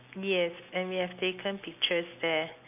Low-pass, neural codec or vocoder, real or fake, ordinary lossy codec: 3.6 kHz; none; real; none